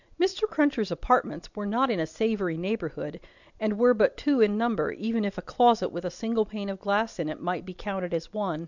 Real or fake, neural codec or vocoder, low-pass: real; none; 7.2 kHz